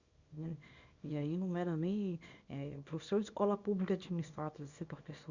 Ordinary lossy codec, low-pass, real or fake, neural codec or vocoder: Opus, 64 kbps; 7.2 kHz; fake; codec, 24 kHz, 0.9 kbps, WavTokenizer, small release